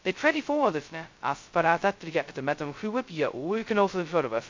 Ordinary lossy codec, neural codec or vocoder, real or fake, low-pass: MP3, 48 kbps; codec, 16 kHz, 0.2 kbps, FocalCodec; fake; 7.2 kHz